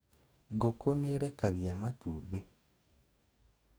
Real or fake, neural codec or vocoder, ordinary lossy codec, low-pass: fake; codec, 44.1 kHz, 2.6 kbps, DAC; none; none